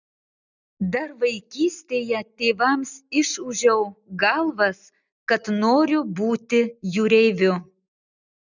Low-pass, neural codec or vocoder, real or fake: 7.2 kHz; none; real